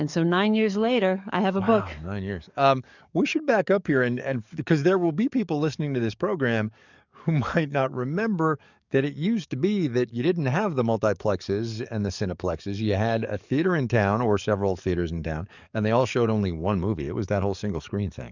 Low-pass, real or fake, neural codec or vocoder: 7.2 kHz; fake; codec, 44.1 kHz, 7.8 kbps, DAC